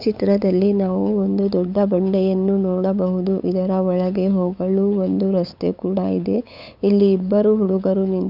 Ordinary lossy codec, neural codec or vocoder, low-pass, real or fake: none; codec, 16 kHz, 8 kbps, FunCodec, trained on LibriTTS, 25 frames a second; 5.4 kHz; fake